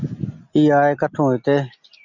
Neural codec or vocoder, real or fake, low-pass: none; real; 7.2 kHz